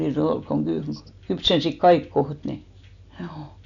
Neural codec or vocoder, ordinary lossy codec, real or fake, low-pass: none; none; real; 7.2 kHz